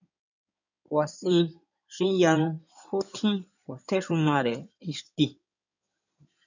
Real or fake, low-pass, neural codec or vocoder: fake; 7.2 kHz; codec, 16 kHz in and 24 kHz out, 2.2 kbps, FireRedTTS-2 codec